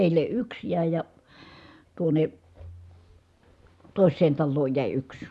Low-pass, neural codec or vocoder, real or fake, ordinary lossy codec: none; none; real; none